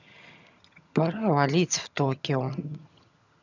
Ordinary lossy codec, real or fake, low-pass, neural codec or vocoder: none; fake; 7.2 kHz; vocoder, 22.05 kHz, 80 mel bands, HiFi-GAN